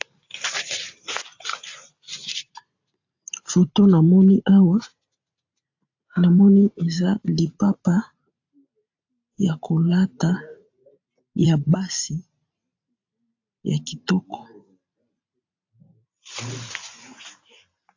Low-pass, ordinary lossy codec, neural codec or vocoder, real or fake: 7.2 kHz; AAC, 48 kbps; vocoder, 44.1 kHz, 128 mel bands every 512 samples, BigVGAN v2; fake